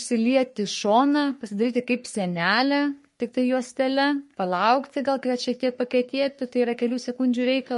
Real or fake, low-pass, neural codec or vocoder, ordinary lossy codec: fake; 14.4 kHz; codec, 44.1 kHz, 3.4 kbps, Pupu-Codec; MP3, 48 kbps